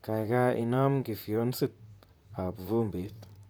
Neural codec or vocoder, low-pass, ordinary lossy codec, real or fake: vocoder, 44.1 kHz, 128 mel bands, Pupu-Vocoder; none; none; fake